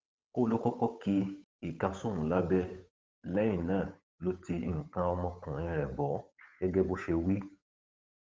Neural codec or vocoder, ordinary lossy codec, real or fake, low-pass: codec, 16 kHz, 8 kbps, FunCodec, trained on Chinese and English, 25 frames a second; none; fake; none